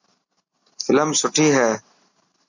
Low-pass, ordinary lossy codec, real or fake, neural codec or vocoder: 7.2 kHz; AAC, 48 kbps; real; none